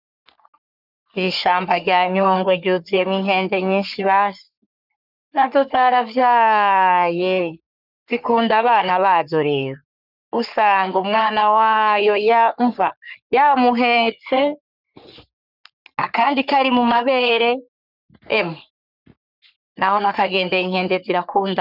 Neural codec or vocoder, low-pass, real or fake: codec, 44.1 kHz, 3.4 kbps, Pupu-Codec; 5.4 kHz; fake